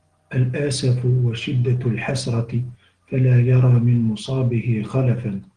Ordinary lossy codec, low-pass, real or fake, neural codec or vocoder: Opus, 16 kbps; 9.9 kHz; real; none